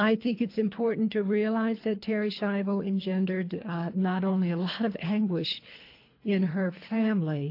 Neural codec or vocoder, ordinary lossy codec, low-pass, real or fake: codec, 24 kHz, 3 kbps, HILCodec; AAC, 32 kbps; 5.4 kHz; fake